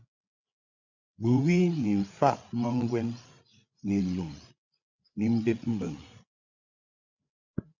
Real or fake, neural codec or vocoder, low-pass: fake; codec, 16 kHz, 8 kbps, FreqCodec, larger model; 7.2 kHz